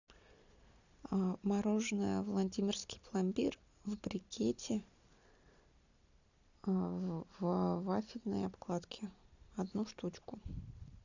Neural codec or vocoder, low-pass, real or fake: none; 7.2 kHz; real